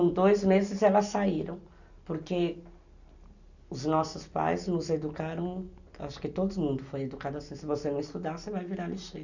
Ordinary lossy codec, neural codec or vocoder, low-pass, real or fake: none; none; 7.2 kHz; real